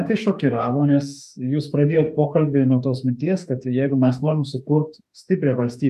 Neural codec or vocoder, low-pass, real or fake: autoencoder, 48 kHz, 32 numbers a frame, DAC-VAE, trained on Japanese speech; 14.4 kHz; fake